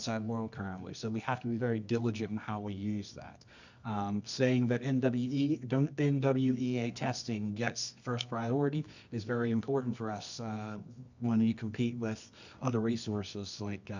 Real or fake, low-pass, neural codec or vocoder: fake; 7.2 kHz; codec, 24 kHz, 0.9 kbps, WavTokenizer, medium music audio release